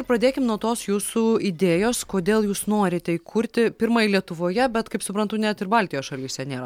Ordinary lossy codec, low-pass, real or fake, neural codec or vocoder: MP3, 96 kbps; 19.8 kHz; real; none